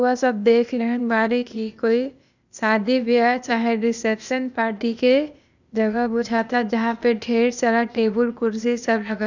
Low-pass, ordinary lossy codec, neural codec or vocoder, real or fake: 7.2 kHz; none; codec, 16 kHz, 0.8 kbps, ZipCodec; fake